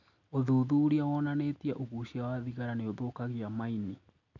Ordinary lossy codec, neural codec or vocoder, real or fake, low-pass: none; none; real; 7.2 kHz